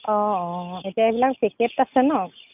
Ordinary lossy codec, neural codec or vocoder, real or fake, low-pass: none; none; real; 3.6 kHz